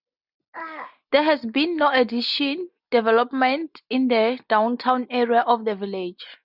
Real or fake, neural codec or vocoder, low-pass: real; none; 5.4 kHz